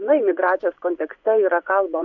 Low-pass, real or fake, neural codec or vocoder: 7.2 kHz; fake; vocoder, 24 kHz, 100 mel bands, Vocos